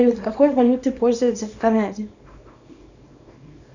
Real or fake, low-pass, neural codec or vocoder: fake; 7.2 kHz; codec, 24 kHz, 0.9 kbps, WavTokenizer, small release